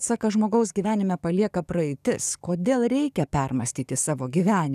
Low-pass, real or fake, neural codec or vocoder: 14.4 kHz; fake; codec, 44.1 kHz, 7.8 kbps, DAC